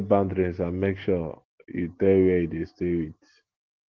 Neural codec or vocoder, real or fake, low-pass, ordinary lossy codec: none; real; 7.2 kHz; Opus, 16 kbps